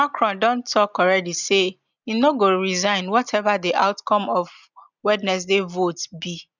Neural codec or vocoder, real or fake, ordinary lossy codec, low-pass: none; real; none; 7.2 kHz